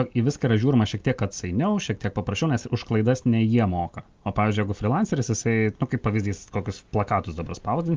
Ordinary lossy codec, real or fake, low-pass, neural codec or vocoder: Opus, 24 kbps; real; 7.2 kHz; none